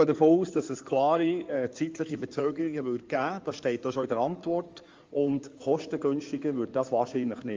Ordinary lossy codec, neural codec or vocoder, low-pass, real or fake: Opus, 32 kbps; codec, 16 kHz in and 24 kHz out, 2.2 kbps, FireRedTTS-2 codec; 7.2 kHz; fake